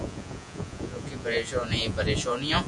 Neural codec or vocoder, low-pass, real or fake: vocoder, 48 kHz, 128 mel bands, Vocos; 10.8 kHz; fake